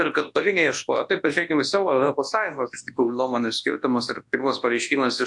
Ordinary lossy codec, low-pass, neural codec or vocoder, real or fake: AAC, 64 kbps; 10.8 kHz; codec, 24 kHz, 0.9 kbps, WavTokenizer, large speech release; fake